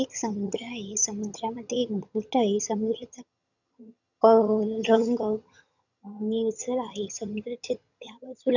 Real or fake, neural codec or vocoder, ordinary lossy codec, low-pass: fake; vocoder, 22.05 kHz, 80 mel bands, HiFi-GAN; none; 7.2 kHz